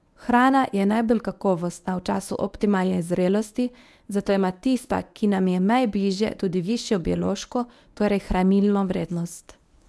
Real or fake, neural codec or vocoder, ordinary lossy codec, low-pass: fake; codec, 24 kHz, 0.9 kbps, WavTokenizer, medium speech release version 1; none; none